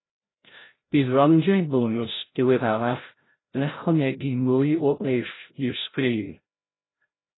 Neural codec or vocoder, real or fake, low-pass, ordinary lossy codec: codec, 16 kHz, 0.5 kbps, FreqCodec, larger model; fake; 7.2 kHz; AAC, 16 kbps